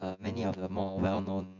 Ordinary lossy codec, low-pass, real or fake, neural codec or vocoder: none; 7.2 kHz; fake; vocoder, 24 kHz, 100 mel bands, Vocos